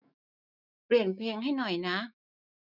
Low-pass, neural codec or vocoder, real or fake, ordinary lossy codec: 5.4 kHz; autoencoder, 48 kHz, 128 numbers a frame, DAC-VAE, trained on Japanese speech; fake; none